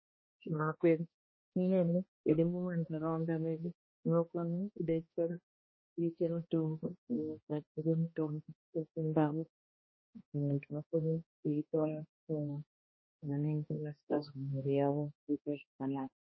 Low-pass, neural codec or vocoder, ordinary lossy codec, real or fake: 7.2 kHz; codec, 16 kHz, 1 kbps, X-Codec, HuBERT features, trained on balanced general audio; MP3, 24 kbps; fake